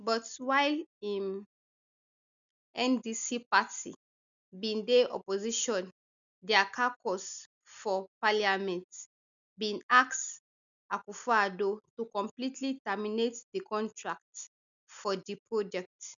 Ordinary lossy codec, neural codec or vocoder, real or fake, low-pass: none; none; real; 7.2 kHz